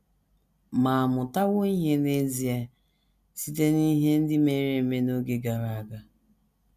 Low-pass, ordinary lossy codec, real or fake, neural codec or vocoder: 14.4 kHz; none; real; none